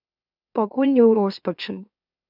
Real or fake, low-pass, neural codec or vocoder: fake; 5.4 kHz; autoencoder, 44.1 kHz, a latent of 192 numbers a frame, MeloTTS